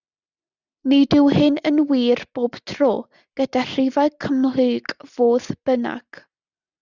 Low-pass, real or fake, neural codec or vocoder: 7.2 kHz; real; none